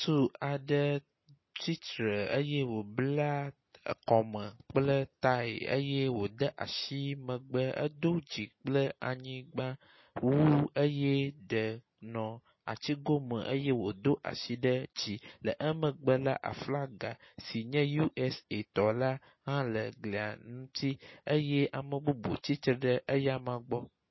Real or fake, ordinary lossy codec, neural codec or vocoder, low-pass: real; MP3, 24 kbps; none; 7.2 kHz